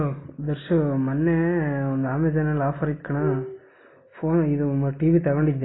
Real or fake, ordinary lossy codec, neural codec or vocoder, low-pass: real; AAC, 16 kbps; none; 7.2 kHz